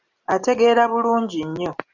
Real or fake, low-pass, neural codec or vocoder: real; 7.2 kHz; none